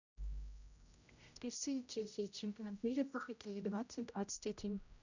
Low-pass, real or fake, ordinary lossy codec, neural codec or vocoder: 7.2 kHz; fake; none; codec, 16 kHz, 0.5 kbps, X-Codec, HuBERT features, trained on general audio